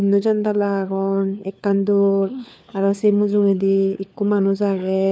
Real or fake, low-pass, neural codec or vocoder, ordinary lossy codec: fake; none; codec, 16 kHz, 4 kbps, FunCodec, trained on LibriTTS, 50 frames a second; none